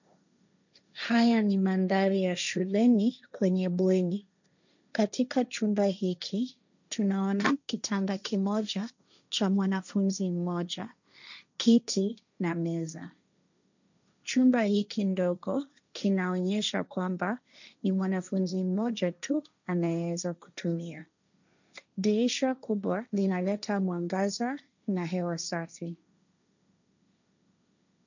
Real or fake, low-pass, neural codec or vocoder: fake; 7.2 kHz; codec, 16 kHz, 1.1 kbps, Voila-Tokenizer